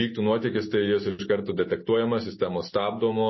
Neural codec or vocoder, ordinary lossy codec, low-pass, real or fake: none; MP3, 24 kbps; 7.2 kHz; real